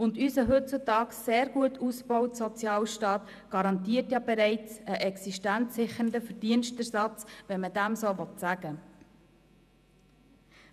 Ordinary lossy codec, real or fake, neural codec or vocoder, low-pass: none; fake; vocoder, 48 kHz, 128 mel bands, Vocos; 14.4 kHz